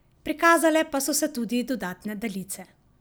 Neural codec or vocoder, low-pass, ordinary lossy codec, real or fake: none; none; none; real